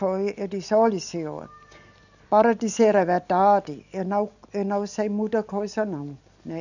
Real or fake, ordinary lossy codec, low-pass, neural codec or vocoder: real; none; 7.2 kHz; none